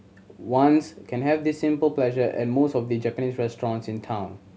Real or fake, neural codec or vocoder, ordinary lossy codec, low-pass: real; none; none; none